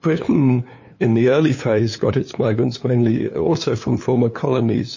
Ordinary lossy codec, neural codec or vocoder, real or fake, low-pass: MP3, 32 kbps; codec, 16 kHz, 2 kbps, FunCodec, trained on LibriTTS, 25 frames a second; fake; 7.2 kHz